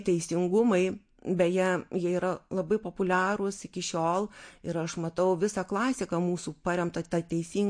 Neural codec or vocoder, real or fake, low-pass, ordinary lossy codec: none; real; 9.9 kHz; MP3, 48 kbps